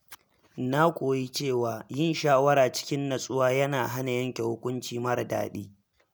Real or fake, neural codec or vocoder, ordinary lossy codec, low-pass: real; none; none; none